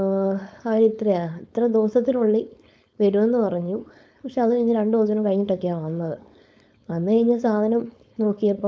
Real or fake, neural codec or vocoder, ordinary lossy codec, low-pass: fake; codec, 16 kHz, 4.8 kbps, FACodec; none; none